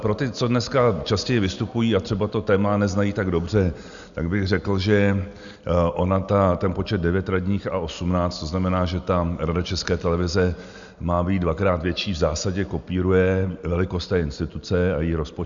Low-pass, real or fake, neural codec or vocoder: 7.2 kHz; real; none